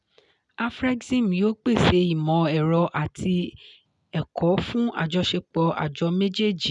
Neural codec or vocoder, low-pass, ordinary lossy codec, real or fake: none; 10.8 kHz; none; real